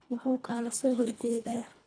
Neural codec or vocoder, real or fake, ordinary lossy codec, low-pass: codec, 24 kHz, 1.5 kbps, HILCodec; fake; none; 9.9 kHz